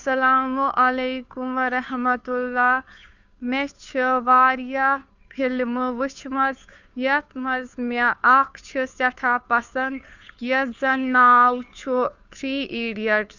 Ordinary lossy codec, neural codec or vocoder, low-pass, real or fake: none; codec, 16 kHz, 2 kbps, FunCodec, trained on Chinese and English, 25 frames a second; 7.2 kHz; fake